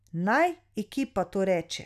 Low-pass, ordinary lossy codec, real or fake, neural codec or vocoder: 14.4 kHz; none; real; none